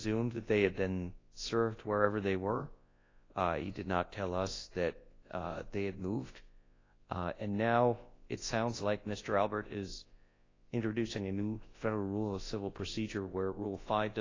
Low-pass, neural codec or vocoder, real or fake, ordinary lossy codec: 7.2 kHz; codec, 24 kHz, 0.9 kbps, WavTokenizer, large speech release; fake; AAC, 32 kbps